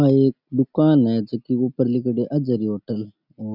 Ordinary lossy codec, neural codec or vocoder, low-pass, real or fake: none; none; 5.4 kHz; real